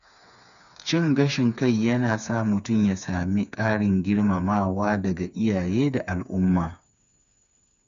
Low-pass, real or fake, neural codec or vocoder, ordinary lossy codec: 7.2 kHz; fake; codec, 16 kHz, 4 kbps, FreqCodec, smaller model; none